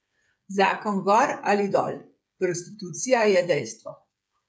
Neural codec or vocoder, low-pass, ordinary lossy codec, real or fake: codec, 16 kHz, 8 kbps, FreqCodec, smaller model; none; none; fake